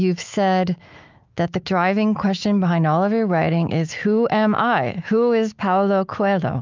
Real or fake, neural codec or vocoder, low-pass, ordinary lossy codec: fake; autoencoder, 48 kHz, 128 numbers a frame, DAC-VAE, trained on Japanese speech; 7.2 kHz; Opus, 24 kbps